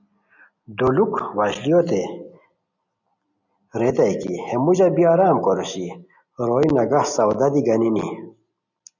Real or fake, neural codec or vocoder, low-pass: real; none; 7.2 kHz